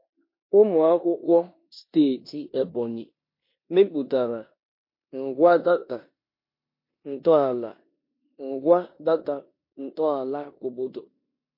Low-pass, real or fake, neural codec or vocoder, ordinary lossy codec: 5.4 kHz; fake; codec, 16 kHz in and 24 kHz out, 0.9 kbps, LongCat-Audio-Codec, four codebook decoder; MP3, 32 kbps